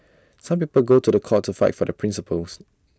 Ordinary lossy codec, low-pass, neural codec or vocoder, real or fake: none; none; none; real